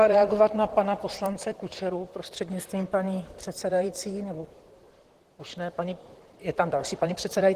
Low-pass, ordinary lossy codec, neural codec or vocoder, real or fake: 14.4 kHz; Opus, 16 kbps; vocoder, 44.1 kHz, 128 mel bands, Pupu-Vocoder; fake